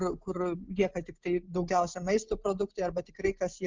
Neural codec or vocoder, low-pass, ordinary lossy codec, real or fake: none; 7.2 kHz; Opus, 32 kbps; real